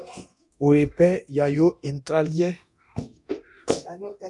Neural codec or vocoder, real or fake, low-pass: codec, 24 kHz, 0.9 kbps, DualCodec; fake; 10.8 kHz